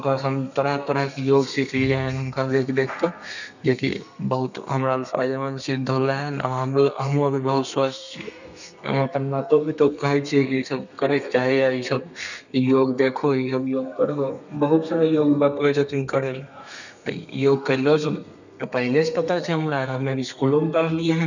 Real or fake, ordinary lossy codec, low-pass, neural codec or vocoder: fake; none; 7.2 kHz; codec, 32 kHz, 1.9 kbps, SNAC